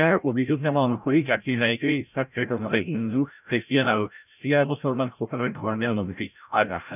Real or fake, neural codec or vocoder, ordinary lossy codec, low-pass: fake; codec, 16 kHz, 0.5 kbps, FreqCodec, larger model; none; 3.6 kHz